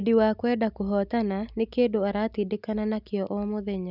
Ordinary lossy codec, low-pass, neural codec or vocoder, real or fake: none; 5.4 kHz; none; real